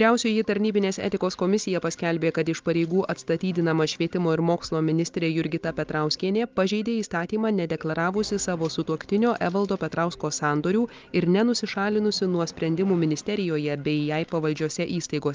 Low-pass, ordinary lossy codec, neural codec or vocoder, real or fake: 7.2 kHz; Opus, 32 kbps; none; real